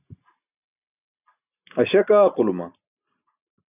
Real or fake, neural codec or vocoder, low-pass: real; none; 3.6 kHz